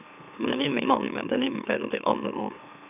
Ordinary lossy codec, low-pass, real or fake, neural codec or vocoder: none; 3.6 kHz; fake; autoencoder, 44.1 kHz, a latent of 192 numbers a frame, MeloTTS